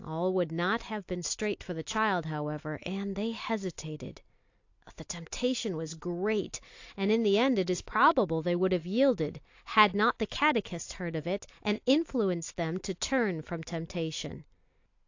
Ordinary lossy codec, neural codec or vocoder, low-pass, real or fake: AAC, 48 kbps; none; 7.2 kHz; real